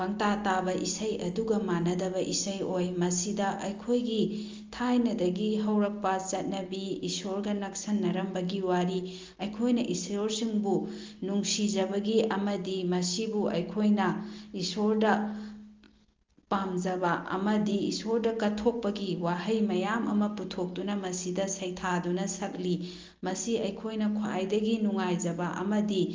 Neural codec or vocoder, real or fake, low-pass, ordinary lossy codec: none; real; 7.2 kHz; Opus, 32 kbps